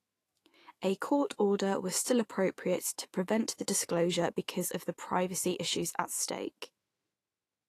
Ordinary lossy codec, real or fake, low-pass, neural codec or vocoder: AAC, 48 kbps; fake; 14.4 kHz; autoencoder, 48 kHz, 128 numbers a frame, DAC-VAE, trained on Japanese speech